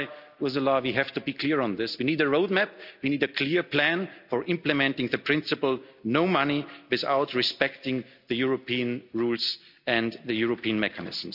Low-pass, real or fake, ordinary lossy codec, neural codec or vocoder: 5.4 kHz; real; none; none